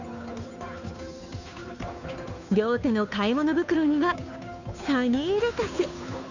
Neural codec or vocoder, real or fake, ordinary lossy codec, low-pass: codec, 16 kHz, 2 kbps, FunCodec, trained on Chinese and English, 25 frames a second; fake; none; 7.2 kHz